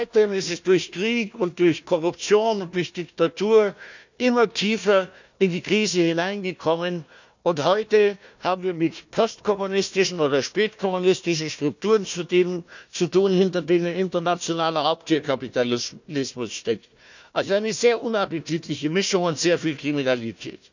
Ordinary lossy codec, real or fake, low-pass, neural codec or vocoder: none; fake; 7.2 kHz; codec, 16 kHz, 1 kbps, FunCodec, trained on Chinese and English, 50 frames a second